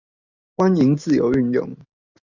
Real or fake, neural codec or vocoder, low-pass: real; none; 7.2 kHz